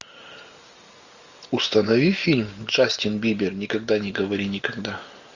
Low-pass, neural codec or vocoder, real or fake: 7.2 kHz; none; real